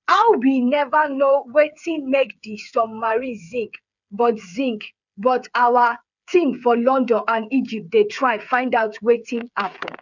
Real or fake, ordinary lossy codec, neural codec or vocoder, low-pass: fake; none; codec, 16 kHz, 8 kbps, FreqCodec, smaller model; 7.2 kHz